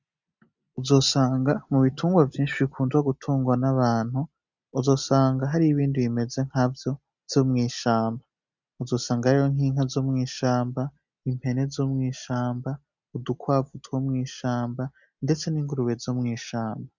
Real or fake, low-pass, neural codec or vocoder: real; 7.2 kHz; none